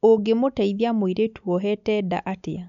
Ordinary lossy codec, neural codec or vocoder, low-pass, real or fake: none; none; 7.2 kHz; real